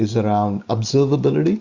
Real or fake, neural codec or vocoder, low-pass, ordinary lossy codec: real; none; 7.2 kHz; Opus, 64 kbps